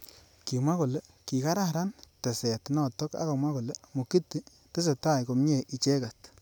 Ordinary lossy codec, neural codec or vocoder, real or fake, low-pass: none; none; real; none